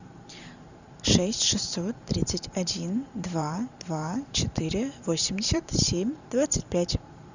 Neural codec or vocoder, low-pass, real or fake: vocoder, 44.1 kHz, 128 mel bands every 512 samples, BigVGAN v2; 7.2 kHz; fake